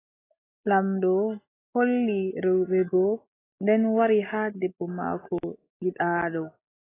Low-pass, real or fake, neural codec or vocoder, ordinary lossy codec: 3.6 kHz; real; none; AAC, 16 kbps